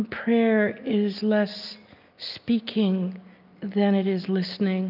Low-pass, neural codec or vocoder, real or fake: 5.4 kHz; none; real